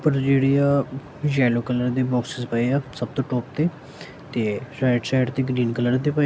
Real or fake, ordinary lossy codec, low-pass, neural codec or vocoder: real; none; none; none